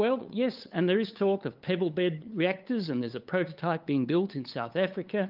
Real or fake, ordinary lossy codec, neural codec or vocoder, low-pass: fake; Opus, 24 kbps; codec, 16 kHz, 8 kbps, FunCodec, trained on LibriTTS, 25 frames a second; 5.4 kHz